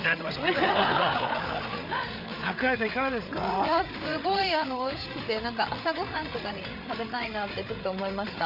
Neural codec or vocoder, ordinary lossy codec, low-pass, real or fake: codec, 16 kHz, 8 kbps, FreqCodec, larger model; none; 5.4 kHz; fake